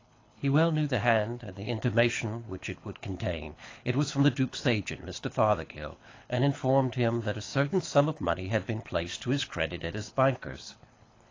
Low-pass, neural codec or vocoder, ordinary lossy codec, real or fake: 7.2 kHz; codec, 24 kHz, 6 kbps, HILCodec; AAC, 32 kbps; fake